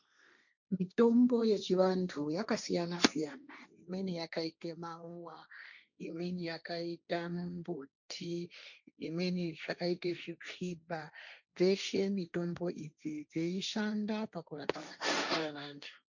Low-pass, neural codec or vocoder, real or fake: 7.2 kHz; codec, 16 kHz, 1.1 kbps, Voila-Tokenizer; fake